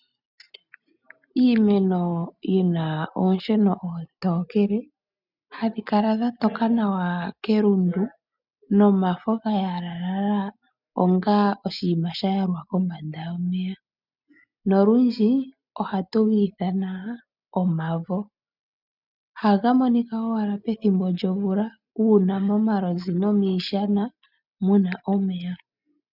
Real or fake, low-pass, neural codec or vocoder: real; 5.4 kHz; none